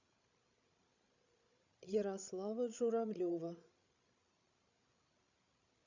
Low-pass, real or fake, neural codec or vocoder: 7.2 kHz; fake; codec, 16 kHz, 16 kbps, FreqCodec, larger model